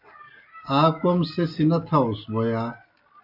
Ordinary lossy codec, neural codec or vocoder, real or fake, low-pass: AAC, 48 kbps; none; real; 5.4 kHz